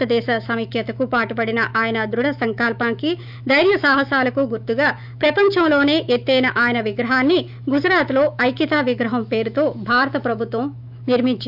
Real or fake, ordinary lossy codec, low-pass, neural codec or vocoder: fake; none; 5.4 kHz; autoencoder, 48 kHz, 128 numbers a frame, DAC-VAE, trained on Japanese speech